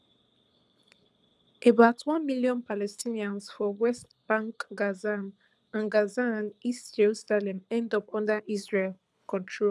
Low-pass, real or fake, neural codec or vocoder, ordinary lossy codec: none; fake; codec, 24 kHz, 6 kbps, HILCodec; none